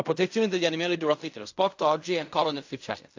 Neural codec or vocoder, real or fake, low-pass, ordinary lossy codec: codec, 16 kHz in and 24 kHz out, 0.4 kbps, LongCat-Audio-Codec, fine tuned four codebook decoder; fake; 7.2 kHz; AAC, 48 kbps